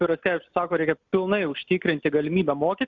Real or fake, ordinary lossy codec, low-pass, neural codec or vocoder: real; Opus, 64 kbps; 7.2 kHz; none